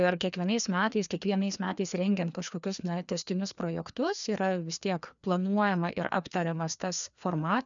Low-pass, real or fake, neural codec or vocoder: 7.2 kHz; fake; codec, 16 kHz, 2 kbps, FreqCodec, larger model